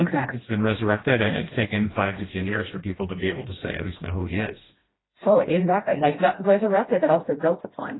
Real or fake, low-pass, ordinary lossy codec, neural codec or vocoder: fake; 7.2 kHz; AAC, 16 kbps; codec, 16 kHz, 1 kbps, FreqCodec, smaller model